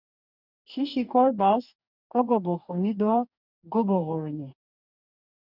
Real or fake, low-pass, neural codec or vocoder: fake; 5.4 kHz; codec, 44.1 kHz, 2.6 kbps, DAC